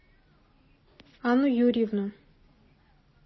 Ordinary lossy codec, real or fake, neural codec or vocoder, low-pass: MP3, 24 kbps; real; none; 7.2 kHz